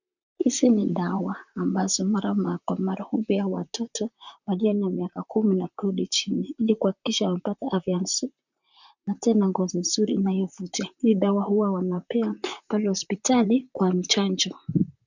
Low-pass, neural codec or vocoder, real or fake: 7.2 kHz; vocoder, 44.1 kHz, 128 mel bands, Pupu-Vocoder; fake